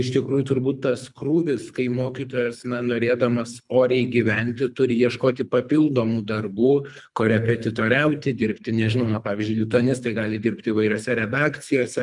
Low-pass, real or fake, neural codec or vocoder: 10.8 kHz; fake; codec, 24 kHz, 3 kbps, HILCodec